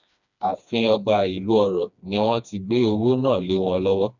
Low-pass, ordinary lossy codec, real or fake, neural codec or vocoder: 7.2 kHz; none; fake; codec, 16 kHz, 2 kbps, FreqCodec, smaller model